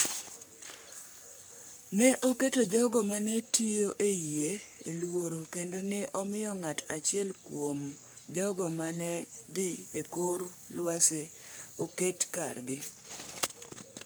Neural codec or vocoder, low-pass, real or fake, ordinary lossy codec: codec, 44.1 kHz, 3.4 kbps, Pupu-Codec; none; fake; none